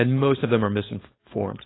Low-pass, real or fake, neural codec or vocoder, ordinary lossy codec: 7.2 kHz; fake; codec, 16 kHz, 4.8 kbps, FACodec; AAC, 16 kbps